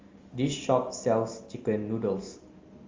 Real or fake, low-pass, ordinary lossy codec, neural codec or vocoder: real; 7.2 kHz; Opus, 32 kbps; none